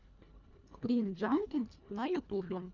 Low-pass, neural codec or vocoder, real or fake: 7.2 kHz; codec, 24 kHz, 1.5 kbps, HILCodec; fake